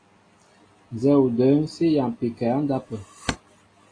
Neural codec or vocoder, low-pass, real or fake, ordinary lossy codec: none; 9.9 kHz; real; AAC, 32 kbps